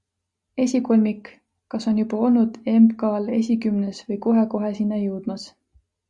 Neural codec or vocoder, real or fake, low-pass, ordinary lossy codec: none; real; 10.8 kHz; Opus, 64 kbps